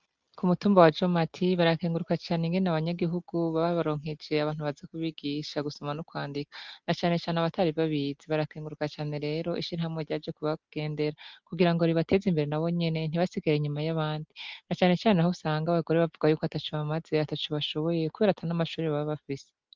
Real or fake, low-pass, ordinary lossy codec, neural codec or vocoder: real; 7.2 kHz; Opus, 32 kbps; none